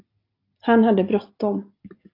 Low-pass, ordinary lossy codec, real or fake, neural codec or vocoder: 5.4 kHz; AAC, 32 kbps; real; none